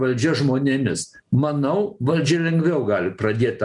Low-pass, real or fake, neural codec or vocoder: 10.8 kHz; real; none